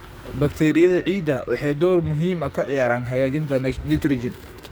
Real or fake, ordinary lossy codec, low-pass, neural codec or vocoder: fake; none; none; codec, 44.1 kHz, 2.6 kbps, SNAC